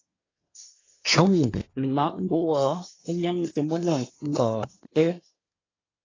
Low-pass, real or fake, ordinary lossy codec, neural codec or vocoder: 7.2 kHz; fake; AAC, 32 kbps; codec, 24 kHz, 1 kbps, SNAC